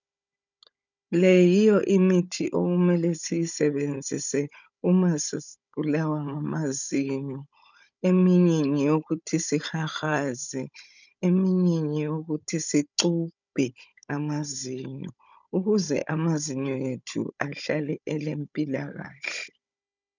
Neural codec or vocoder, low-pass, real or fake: codec, 16 kHz, 16 kbps, FunCodec, trained on Chinese and English, 50 frames a second; 7.2 kHz; fake